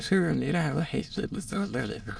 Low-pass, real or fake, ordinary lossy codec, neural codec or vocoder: none; fake; none; autoencoder, 22.05 kHz, a latent of 192 numbers a frame, VITS, trained on many speakers